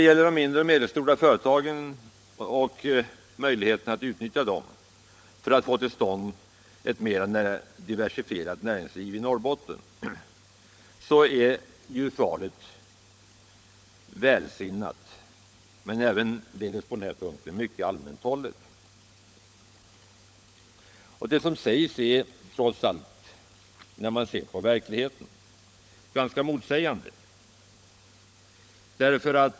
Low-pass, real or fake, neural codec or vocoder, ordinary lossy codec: none; fake; codec, 16 kHz, 16 kbps, FunCodec, trained on LibriTTS, 50 frames a second; none